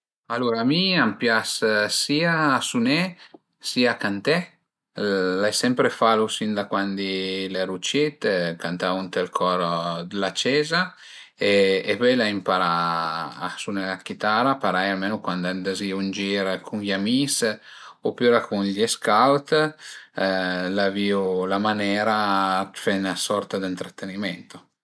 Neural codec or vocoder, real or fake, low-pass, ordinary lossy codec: none; real; none; none